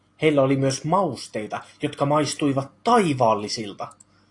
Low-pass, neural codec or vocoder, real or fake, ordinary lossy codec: 10.8 kHz; none; real; AAC, 48 kbps